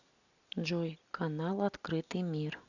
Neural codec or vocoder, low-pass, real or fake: none; 7.2 kHz; real